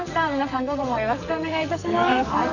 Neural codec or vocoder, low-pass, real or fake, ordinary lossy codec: codec, 44.1 kHz, 2.6 kbps, SNAC; 7.2 kHz; fake; none